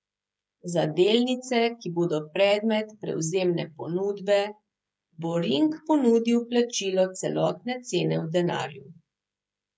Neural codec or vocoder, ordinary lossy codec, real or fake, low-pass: codec, 16 kHz, 16 kbps, FreqCodec, smaller model; none; fake; none